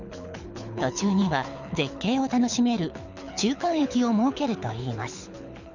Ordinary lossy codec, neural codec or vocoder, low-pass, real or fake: none; codec, 24 kHz, 6 kbps, HILCodec; 7.2 kHz; fake